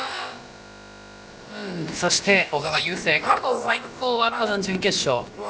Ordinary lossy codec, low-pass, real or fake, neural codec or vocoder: none; none; fake; codec, 16 kHz, about 1 kbps, DyCAST, with the encoder's durations